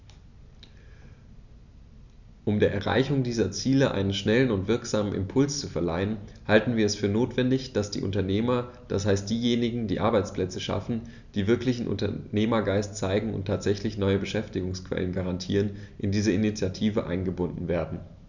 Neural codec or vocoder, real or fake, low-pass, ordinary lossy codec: none; real; 7.2 kHz; none